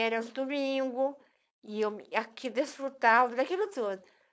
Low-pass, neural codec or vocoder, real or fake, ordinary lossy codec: none; codec, 16 kHz, 4.8 kbps, FACodec; fake; none